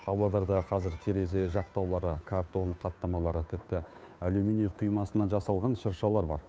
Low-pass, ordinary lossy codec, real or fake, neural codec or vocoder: none; none; fake; codec, 16 kHz, 2 kbps, FunCodec, trained on Chinese and English, 25 frames a second